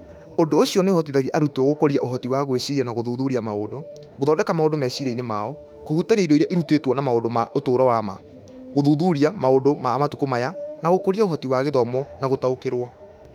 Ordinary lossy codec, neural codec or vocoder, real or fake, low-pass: none; autoencoder, 48 kHz, 32 numbers a frame, DAC-VAE, trained on Japanese speech; fake; 19.8 kHz